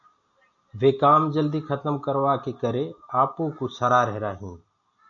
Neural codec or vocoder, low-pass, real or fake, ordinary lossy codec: none; 7.2 kHz; real; MP3, 96 kbps